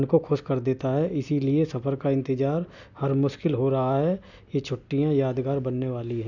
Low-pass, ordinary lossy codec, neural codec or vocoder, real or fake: 7.2 kHz; none; none; real